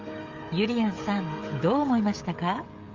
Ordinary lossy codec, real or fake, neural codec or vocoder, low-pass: Opus, 32 kbps; fake; codec, 16 kHz, 16 kbps, FreqCodec, smaller model; 7.2 kHz